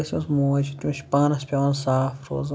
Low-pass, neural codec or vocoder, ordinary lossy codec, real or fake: none; none; none; real